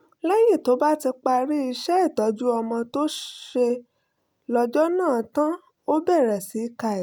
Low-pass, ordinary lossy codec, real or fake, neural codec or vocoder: none; none; real; none